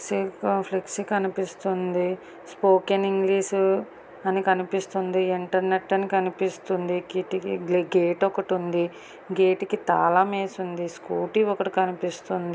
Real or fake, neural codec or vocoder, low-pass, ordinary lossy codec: real; none; none; none